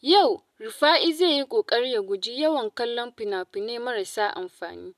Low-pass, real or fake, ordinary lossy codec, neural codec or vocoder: 14.4 kHz; real; none; none